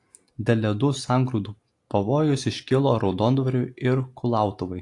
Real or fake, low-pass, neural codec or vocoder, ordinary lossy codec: real; 10.8 kHz; none; AAC, 48 kbps